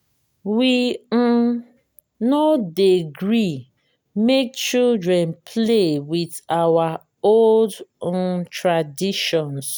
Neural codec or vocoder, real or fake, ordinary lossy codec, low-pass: none; real; none; none